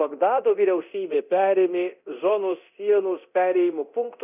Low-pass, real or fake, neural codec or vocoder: 3.6 kHz; fake; codec, 24 kHz, 0.9 kbps, DualCodec